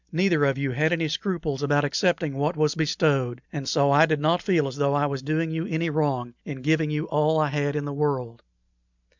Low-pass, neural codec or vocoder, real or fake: 7.2 kHz; none; real